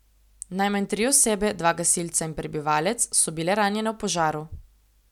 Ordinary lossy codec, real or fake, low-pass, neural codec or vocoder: none; real; 19.8 kHz; none